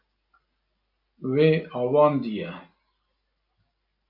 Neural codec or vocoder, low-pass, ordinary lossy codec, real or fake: none; 5.4 kHz; MP3, 48 kbps; real